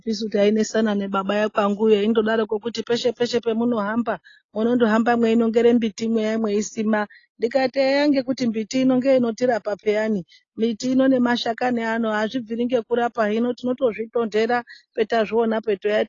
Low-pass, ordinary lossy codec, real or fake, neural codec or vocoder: 7.2 kHz; AAC, 32 kbps; real; none